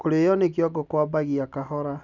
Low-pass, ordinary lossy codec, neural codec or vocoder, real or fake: 7.2 kHz; none; none; real